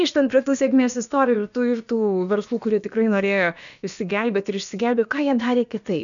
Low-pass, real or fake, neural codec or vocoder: 7.2 kHz; fake; codec, 16 kHz, about 1 kbps, DyCAST, with the encoder's durations